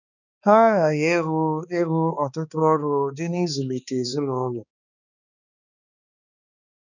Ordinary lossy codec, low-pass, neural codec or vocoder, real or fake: none; 7.2 kHz; codec, 16 kHz, 2 kbps, X-Codec, HuBERT features, trained on balanced general audio; fake